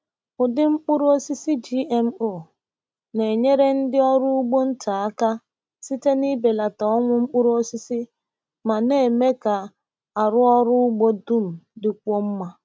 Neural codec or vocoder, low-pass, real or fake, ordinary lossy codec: none; none; real; none